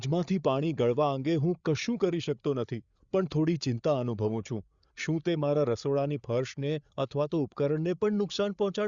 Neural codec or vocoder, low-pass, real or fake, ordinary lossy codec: codec, 16 kHz, 8 kbps, FreqCodec, larger model; 7.2 kHz; fake; Opus, 64 kbps